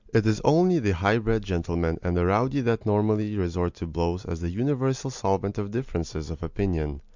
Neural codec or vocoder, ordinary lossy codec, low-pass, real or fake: none; Opus, 64 kbps; 7.2 kHz; real